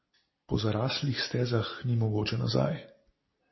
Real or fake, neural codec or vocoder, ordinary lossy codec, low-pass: real; none; MP3, 24 kbps; 7.2 kHz